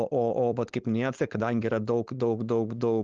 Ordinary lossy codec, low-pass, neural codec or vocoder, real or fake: Opus, 16 kbps; 7.2 kHz; codec, 16 kHz, 4.8 kbps, FACodec; fake